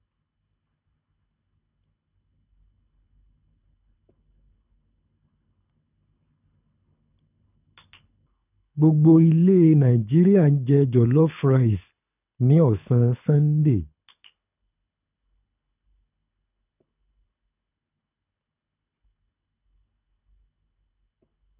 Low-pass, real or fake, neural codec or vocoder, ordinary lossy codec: 3.6 kHz; fake; codec, 24 kHz, 6 kbps, HILCodec; none